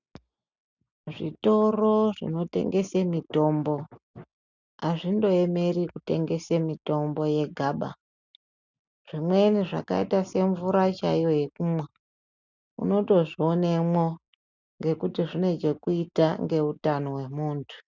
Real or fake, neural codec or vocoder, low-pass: real; none; 7.2 kHz